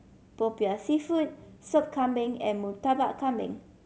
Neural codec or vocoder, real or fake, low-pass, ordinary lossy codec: none; real; none; none